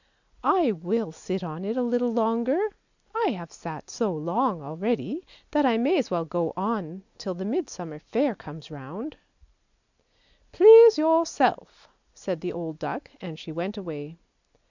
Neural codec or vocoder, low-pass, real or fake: none; 7.2 kHz; real